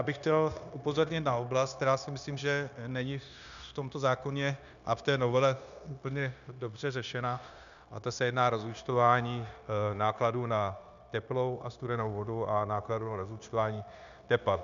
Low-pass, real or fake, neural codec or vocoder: 7.2 kHz; fake; codec, 16 kHz, 0.9 kbps, LongCat-Audio-Codec